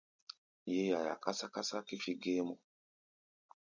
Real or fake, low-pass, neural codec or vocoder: real; 7.2 kHz; none